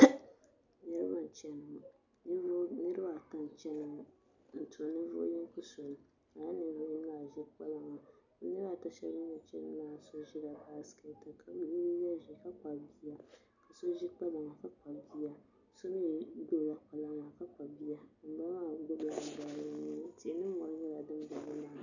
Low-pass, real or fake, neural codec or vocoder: 7.2 kHz; real; none